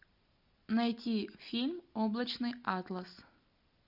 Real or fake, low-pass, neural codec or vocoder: real; 5.4 kHz; none